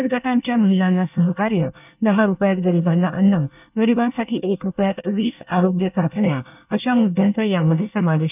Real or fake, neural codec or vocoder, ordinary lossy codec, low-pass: fake; codec, 24 kHz, 1 kbps, SNAC; none; 3.6 kHz